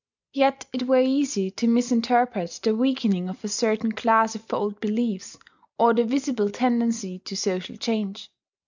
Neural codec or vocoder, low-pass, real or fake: none; 7.2 kHz; real